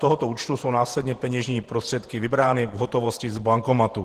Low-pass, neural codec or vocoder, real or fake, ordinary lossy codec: 14.4 kHz; vocoder, 48 kHz, 128 mel bands, Vocos; fake; Opus, 16 kbps